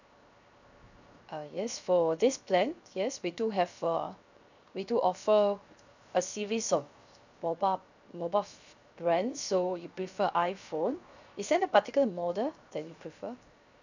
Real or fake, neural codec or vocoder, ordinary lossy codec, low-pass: fake; codec, 16 kHz, 0.7 kbps, FocalCodec; none; 7.2 kHz